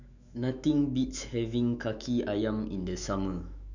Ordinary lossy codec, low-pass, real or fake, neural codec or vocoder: none; 7.2 kHz; fake; autoencoder, 48 kHz, 128 numbers a frame, DAC-VAE, trained on Japanese speech